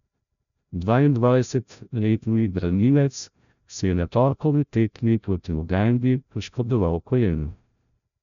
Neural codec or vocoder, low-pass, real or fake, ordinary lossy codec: codec, 16 kHz, 0.5 kbps, FreqCodec, larger model; 7.2 kHz; fake; none